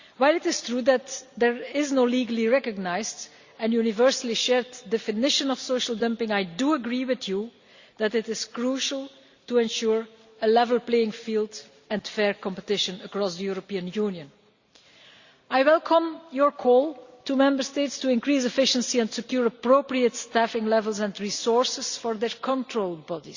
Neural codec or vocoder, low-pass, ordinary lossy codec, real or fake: none; 7.2 kHz; Opus, 64 kbps; real